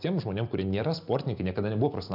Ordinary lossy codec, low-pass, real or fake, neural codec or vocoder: AAC, 48 kbps; 5.4 kHz; real; none